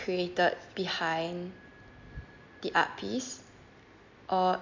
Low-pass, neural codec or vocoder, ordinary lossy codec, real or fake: 7.2 kHz; none; MP3, 64 kbps; real